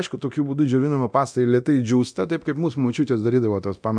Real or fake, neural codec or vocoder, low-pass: fake; codec, 24 kHz, 0.9 kbps, DualCodec; 9.9 kHz